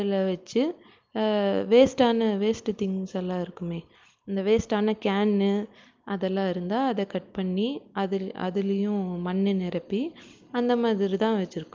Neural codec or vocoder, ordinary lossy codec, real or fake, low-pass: none; Opus, 24 kbps; real; 7.2 kHz